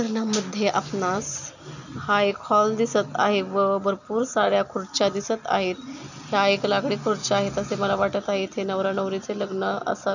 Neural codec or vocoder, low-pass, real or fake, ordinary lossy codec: none; 7.2 kHz; real; none